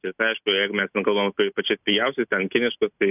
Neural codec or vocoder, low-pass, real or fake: none; 3.6 kHz; real